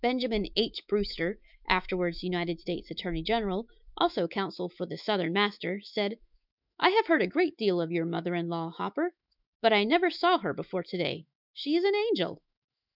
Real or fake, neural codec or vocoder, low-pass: fake; autoencoder, 48 kHz, 128 numbers a frame, DAC-VAE, trained on Japanese speech; 5.4 kHz